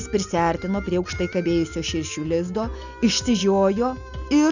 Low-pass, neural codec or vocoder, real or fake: 7.2 kHz; none; real